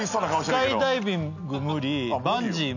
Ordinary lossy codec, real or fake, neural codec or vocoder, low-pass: none; real; none; 7.2 kHz